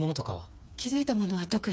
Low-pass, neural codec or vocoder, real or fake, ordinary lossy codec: none; codec, 16 kHz, 4 kbps, FreqCodec, smaller model; fake; none